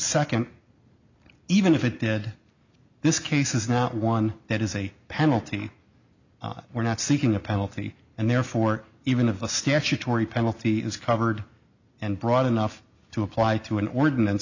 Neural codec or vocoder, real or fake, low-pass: none; real; 7.2 kHz